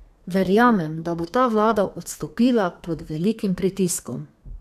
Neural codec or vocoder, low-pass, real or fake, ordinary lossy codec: codec, 32 kHz, 1.9 kbps, SNAC; 14.4 kHz; fake; none